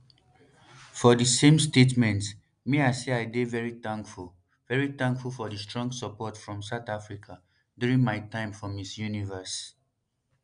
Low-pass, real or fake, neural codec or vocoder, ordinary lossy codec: 9.9 kHz; real; none; none